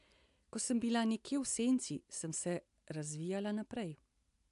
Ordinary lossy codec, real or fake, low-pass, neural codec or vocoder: AAC, 96 kbps; real; 10.8 kHz; none